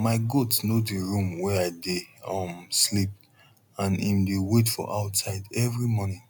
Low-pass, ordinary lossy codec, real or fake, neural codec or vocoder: 19.8 kHz; none; real; none